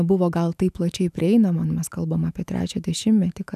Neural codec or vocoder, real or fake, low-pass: autoencoder, 48 kHz, 128 numbers a frame, DAC-VAE, trained on Japanese speech; fake; 14.4 kHz